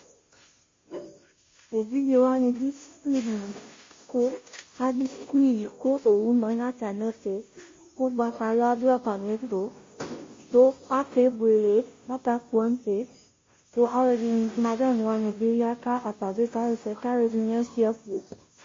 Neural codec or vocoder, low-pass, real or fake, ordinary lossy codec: codec, 16 kHz, 0.5 kbps, FunCodec, trained on Chinese and English, 25 frames a second; 7.2 kHz; fake; MP3, 32 kbps